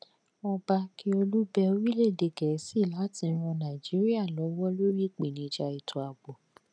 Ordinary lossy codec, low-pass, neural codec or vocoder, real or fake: none; 9.9 kHz; none; real